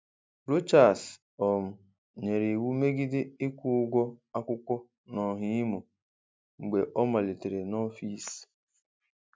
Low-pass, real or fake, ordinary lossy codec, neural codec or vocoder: none; real; none; none